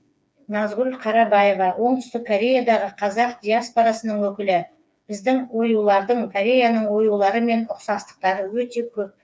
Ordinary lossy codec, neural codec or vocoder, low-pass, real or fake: none; codec, 16 kHz, 4 kbps, FreqCodec, smaller model; none; fake